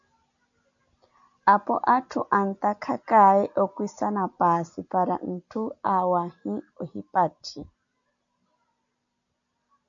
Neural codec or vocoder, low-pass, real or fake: none; 7.2 kHz; real